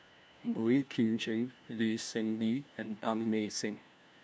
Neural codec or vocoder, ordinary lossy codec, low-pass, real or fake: codec, 16 kHz, 1 kbps, FunCodec, trained on LibriTTS, 50 frames a second; none; none; fake